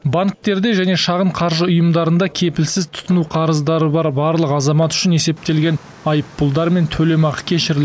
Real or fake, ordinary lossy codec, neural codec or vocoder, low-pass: real; none; none; none